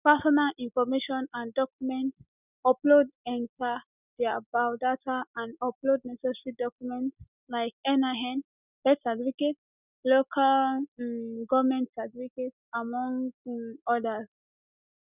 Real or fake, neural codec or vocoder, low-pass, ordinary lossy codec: real; none; 3.6 kHz; none